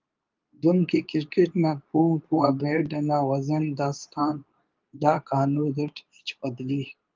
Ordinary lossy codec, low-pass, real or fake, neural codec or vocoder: Opus, 24 kbps; 7.2 kHz; fake; codec, 24 kHz, 0.9 kbps, WavTokenizer, medium speech release version 2